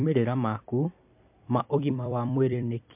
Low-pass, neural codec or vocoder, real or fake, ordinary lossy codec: 3.6 kHz; vocoder, 44.1 kHz, 128 mel bands every 256 samples, BigVGAN v2; fake; MP3, 32 kbps